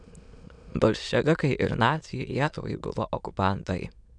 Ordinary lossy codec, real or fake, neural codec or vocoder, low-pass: MP3, 96 kbps; fake; autoencoder, 22.05 kHz, a latent of 192 numbers a frame, VITS, trained on many speakers; 9.9 kHz